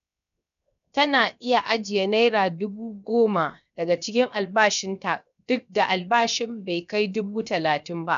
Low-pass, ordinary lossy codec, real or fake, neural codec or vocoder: 7.2 kHz; none; fake; codec, 16 kHz, 0.7 kbps, FocalCodec